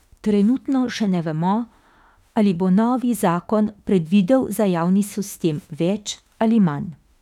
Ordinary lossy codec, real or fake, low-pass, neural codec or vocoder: none; fake; 19.8 kHz; autoencoder, 48 kHz, 32 numbers a frame, DAC-VAE, trained on Japanese speech